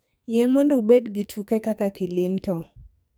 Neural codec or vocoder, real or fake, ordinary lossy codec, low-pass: codec, 44.1 kHz, 2.6 kbps, SNAC; fake; none; none